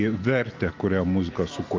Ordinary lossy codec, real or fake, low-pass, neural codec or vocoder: Opus, 32 kbps; real; 7.2 kHz; none